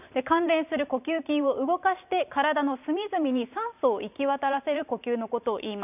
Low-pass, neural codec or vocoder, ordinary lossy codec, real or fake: 3.6 kHz; vocoder, 44.1 kHz, 128 mel bands every 512 samples, BigVGAN v2; none; fake